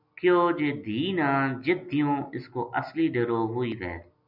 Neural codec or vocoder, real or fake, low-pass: none; real; 5.4 kHz